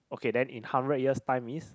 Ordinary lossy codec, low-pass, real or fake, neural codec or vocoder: none; none; real; none